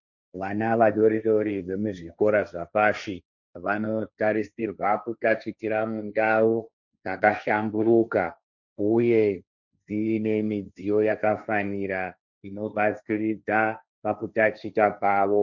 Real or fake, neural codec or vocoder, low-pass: fake; codec, 16 kHz, 1.1 kbps, Voila-Tokenizer; 7.2 kHz